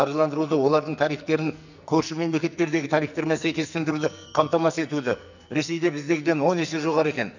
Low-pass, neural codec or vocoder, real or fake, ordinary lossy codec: 7.2 kHz; codec, 44.1 kHz, 2.6 kbps, SNAC; fake; none